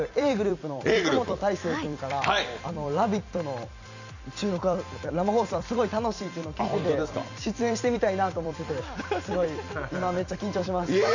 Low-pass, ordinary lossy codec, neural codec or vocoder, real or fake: 7.2 kHz; none; vocoder, 44.1 kHz, 128 mel bands every 256 samples, BigVGAN v2; fake